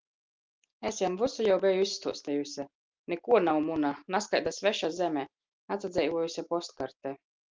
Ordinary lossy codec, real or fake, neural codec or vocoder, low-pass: Opus, 24 kbps; real; none; 7.2 kHz